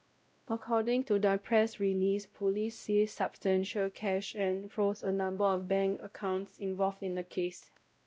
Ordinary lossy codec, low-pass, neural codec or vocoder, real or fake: none; none; codec, 16 kHz, 0.5 kbps, X-Codec, WavLM features, trained on Multilingual LibriSpeech; fake